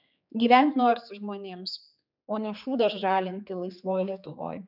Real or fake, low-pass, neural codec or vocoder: fake; 5.4 kHz; codec, 16 kHz, 4 kbps, X-Codec, HuBERT features, trained on general audio